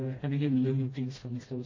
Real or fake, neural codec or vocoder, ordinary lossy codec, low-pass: fake; codec, 16 kHz, 1 kbps, FreqCodec, smaller model; MP3, 32 kbps; 7.2 kHz